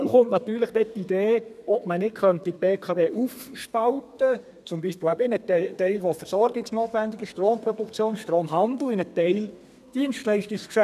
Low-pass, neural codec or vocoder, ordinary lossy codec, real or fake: 14.4 kHz; codec, 32 kHz, 1.9 kbps, SNAC; none; fake